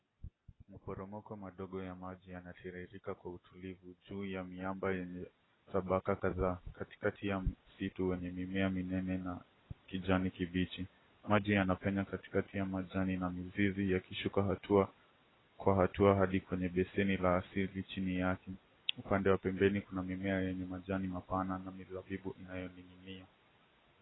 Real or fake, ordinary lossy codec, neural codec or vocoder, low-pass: real; AAC, 16 kbps; none; 7.2 kHz